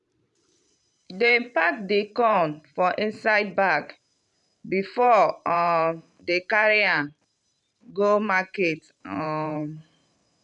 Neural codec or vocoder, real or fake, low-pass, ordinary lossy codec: vocoder, 44.1 kHz, 128 mel bands every 512 samples, BigVGAN v2; fake; 10.8 kHz; none